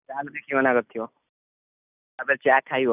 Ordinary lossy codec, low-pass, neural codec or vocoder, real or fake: none; 3.6 kHz; none; real